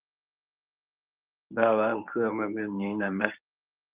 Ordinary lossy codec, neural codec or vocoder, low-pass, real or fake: Opus, 24 kbps; codec, 24 kHz, 0.9 kbps, WavTokenizer, medium speech release version 1; 3.6 kHz; fake